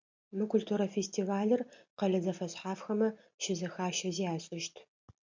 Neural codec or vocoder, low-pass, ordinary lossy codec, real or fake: none; 7.2 kHz; MP3, 64 kbps; real